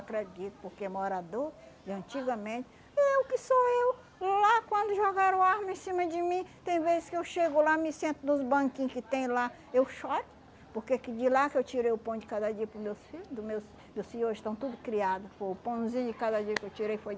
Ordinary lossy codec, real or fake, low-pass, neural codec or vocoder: none; real; none; none